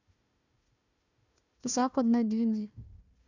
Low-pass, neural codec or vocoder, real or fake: 7.2 kHz; codec, 16 kHz, 1 kbps, FunCodec, trained on Chinese and English, 50 frames a second; fake